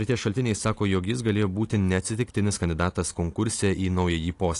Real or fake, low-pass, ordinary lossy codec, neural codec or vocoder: real; 10.8 kHz; AAC, 48 kbps; none